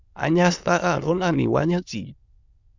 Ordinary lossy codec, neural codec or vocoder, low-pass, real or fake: Opus, 64 kbps; autoencoder, 22.05 kHz, a latent of 192 numbers a frame, VITS, trained on many speakers; 7.2 kHz; fake